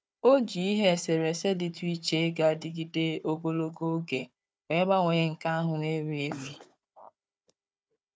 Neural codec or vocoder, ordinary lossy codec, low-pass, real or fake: codec, 16 kHz, 4 kbps, FunCodec, trained on Chinese and English, 50 frames a second; none; none; fake